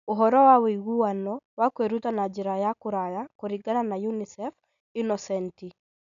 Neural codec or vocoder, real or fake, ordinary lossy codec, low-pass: none; real; none; 7.2 kHz